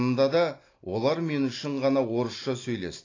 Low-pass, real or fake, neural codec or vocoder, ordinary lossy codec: 7.2 kHz; real; none; AAC, 32 kbps